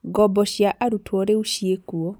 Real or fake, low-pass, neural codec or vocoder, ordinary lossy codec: real; none; none; none